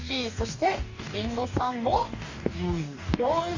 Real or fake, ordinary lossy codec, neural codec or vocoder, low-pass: fake; Opus, 64 kbps; codec, 44.1 kHz, 2.6 kbps, DAC; 7.2 kHz